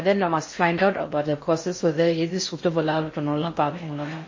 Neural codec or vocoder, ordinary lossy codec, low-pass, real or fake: codec, 16 kHz in and 24 kHz out, 0.6 kbps, FocalCodec, streaming, 4096 codes; MP3, 32 kbps; 7.2 kHz; fake